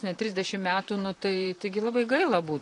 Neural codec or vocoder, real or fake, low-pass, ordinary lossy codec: vocoder, 44.1 kHz, 128 mel bands, Pupu-Vocoder; fake; 10.8 kHz; AAC, 48 kbps